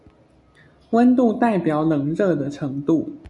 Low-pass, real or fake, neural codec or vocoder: 10.8 kHz; real; none